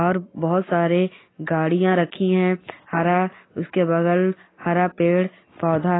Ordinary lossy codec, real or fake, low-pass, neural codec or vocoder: AAC, 16 kbps; real; 7.2 kHz; none